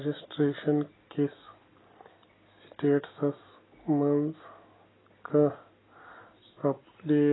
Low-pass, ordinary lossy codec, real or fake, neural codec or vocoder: 7.2 kHz; AAC, 16 kbps; real; none